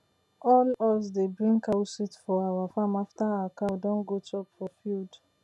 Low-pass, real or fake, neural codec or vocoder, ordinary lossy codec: none; real; none; none